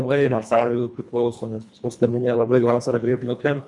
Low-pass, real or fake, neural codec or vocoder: 10.8 kHz; fake; codec, 24 kHz, 1.5 kbps, HILCodec